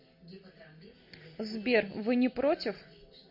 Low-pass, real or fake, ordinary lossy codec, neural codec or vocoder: 5.4 kHz; real; MP3, 32 kbps; none